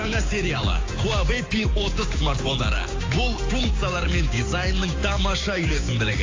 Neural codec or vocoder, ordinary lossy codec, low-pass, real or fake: codec, 16 kHz, 6 kbps, DAC; AAC, 32 kbps; 7.2 kHz; fake